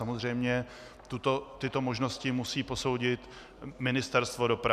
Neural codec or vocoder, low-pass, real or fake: none; 14.4 kHz; real